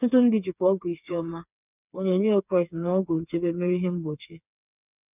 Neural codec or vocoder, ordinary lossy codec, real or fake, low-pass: codec, 16 kHz, 4 kbps, FreqCodec, smaller model; none; fake; 3.6 kHz